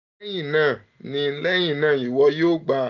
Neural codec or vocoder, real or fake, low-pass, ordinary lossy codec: none; real; 7.2 kHz; none